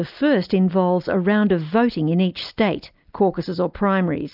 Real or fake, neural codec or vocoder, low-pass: real; none; 5.4 kHz